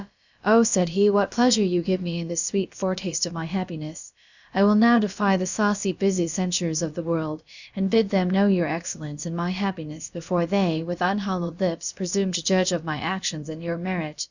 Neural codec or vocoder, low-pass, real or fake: codec, 16 kHz, about 1 kbps, DyCAST, with the encoder's durations; 7.2 kHz; fake